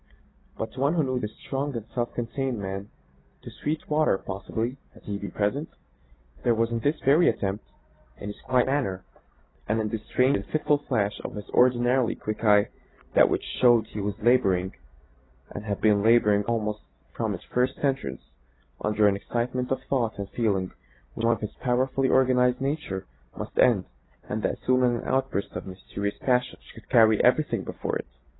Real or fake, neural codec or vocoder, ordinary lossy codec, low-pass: real; none; AAC, 16 kbps; 7.2 kHz